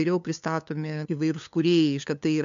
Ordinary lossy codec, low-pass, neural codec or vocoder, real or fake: MP3, 64 kbps; 7.2 kHz; codec, 16 kHz, 2 kbps, FunCodec, trained on LibriTTS, 25 frames a second; fake